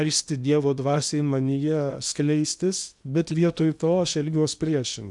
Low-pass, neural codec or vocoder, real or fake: 10.8 kHz; codec, 16 kHz in and 24 kHz out, 0.8 kbps, FocalCodec, streaming, 65536 codes; fake